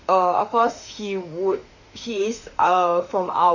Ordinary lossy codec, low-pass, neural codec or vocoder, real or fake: Opus, 64 kbps; 7.2 kHz; autoencoder, 48 kHz, 32 numbers a frame, DAC-VAE, trained on Japanese speech; fake